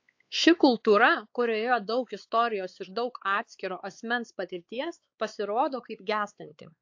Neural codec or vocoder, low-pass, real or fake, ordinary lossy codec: codec, 16 kHz, 4 kbps, X-Codec, WavLM features, trained on Multilingual LibriSpeech; 7.2 kHz; fake; AAC, 48 kbps